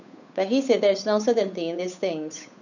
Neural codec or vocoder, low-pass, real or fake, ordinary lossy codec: codec, 16 kHz, 8 kbps, FunCodec, trained on Chinese and English, 25 frames a second; 7.2 kHz; fake; none